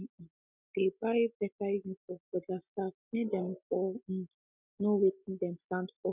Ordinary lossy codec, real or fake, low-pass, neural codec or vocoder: none; real; 3.6 kHz; none